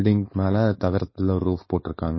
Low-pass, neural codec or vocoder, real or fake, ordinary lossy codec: 7.2 kHz; codec, 16 kHz, 4.8 kbps, FACodec; fake; MP3, 24 kbps